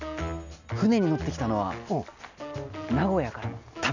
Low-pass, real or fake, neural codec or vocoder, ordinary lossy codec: 7.2 kHz; real; none; none